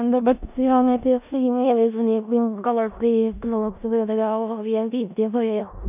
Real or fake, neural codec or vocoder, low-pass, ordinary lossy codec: fake; codec, 16 kHz in and 24 kHz out, 0.4 kbps, LongCat-Audio-Codec, four codebook decoder; 3.6 kHz; none